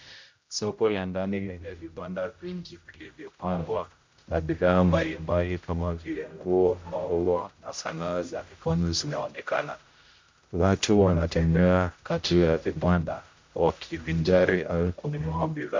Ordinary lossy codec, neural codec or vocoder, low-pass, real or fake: MP3, 48 kbps; codec, 16 kHz, 0.5 kbps, X-Codec, HuBERT features, trained on general audio; 7.2 kHz; fake